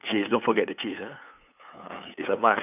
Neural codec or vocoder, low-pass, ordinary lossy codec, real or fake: codec, 16 kHz, 8 kbps, FunCodec, trained on LibriTTS, 25 frames a second; 3.6 kHz; none; fake